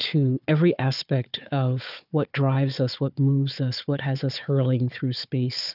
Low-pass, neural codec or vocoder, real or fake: 5.4 kHz; codec, 16 kHz, 4 kbps, FunCodec, trained on Chinese and English, 50 frames a second; fake